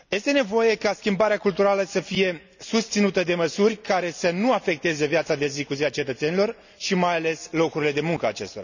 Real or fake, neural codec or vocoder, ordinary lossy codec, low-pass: real; none; none; 7.2 kHz